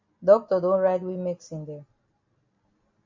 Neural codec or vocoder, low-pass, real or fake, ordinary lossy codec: none; 7.2 kHz; real; MP3, 48 kbps